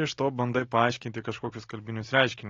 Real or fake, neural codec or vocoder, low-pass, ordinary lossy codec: real; none; 7.2 kHz; AAC, 32 kbps